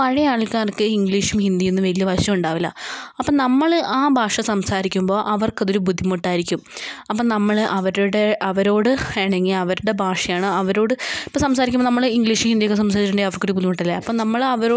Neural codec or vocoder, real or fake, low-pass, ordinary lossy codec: none; real; none; none